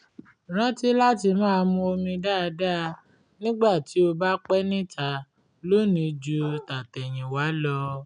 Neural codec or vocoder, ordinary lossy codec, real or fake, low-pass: none; none; real; 9.9 kHz